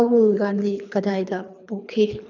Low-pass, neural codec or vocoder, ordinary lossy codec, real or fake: 7.2 kHz; codec, 24 kHz, 3 kbps, HILCodec; none; fake